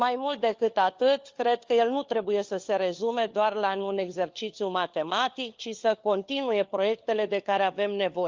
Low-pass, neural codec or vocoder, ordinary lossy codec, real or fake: 7.2 kHz; codec, 16 kHz, 4 kbps, FunCodec, trained on LibriTTS, 50 frames a second; Opus, 32 kbps; fake